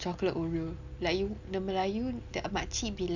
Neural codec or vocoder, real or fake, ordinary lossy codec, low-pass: none; real; none; 7.2 kHz